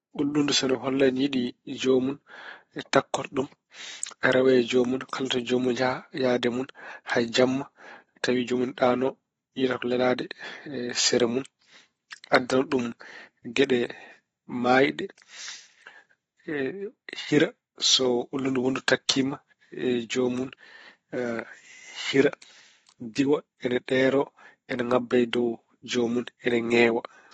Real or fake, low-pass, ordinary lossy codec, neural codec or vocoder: fake; 19.8 kHz; AAC, 24 kbps; vocoder, 44.1 kHz, 128 mel bands every 512 samples, BigVGAN v2